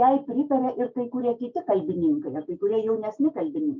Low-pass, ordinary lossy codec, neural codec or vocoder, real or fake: 7.2 kHz; MP3, 64 kbps; none; real